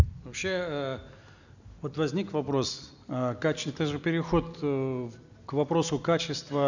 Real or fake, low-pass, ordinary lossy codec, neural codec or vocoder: real; 7.2 kHz; none; none